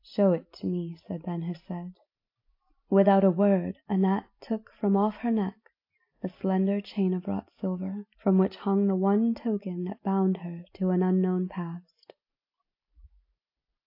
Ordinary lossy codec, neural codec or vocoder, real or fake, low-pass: AAC, 32 kbps; none; real; 5.4 kHz